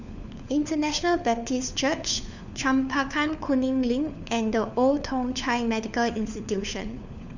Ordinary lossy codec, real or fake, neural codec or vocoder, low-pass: none; fake; codec, 16 kHz, 4 kbps, FunCodec, trained on LibriTTS, 50 frames a second; 7.2 kHz